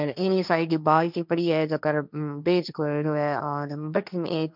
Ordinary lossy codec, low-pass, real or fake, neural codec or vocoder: none; 5.4 kHz; fake; codec, 16 kHz, 1.1 kbps, Voila-Tokenizer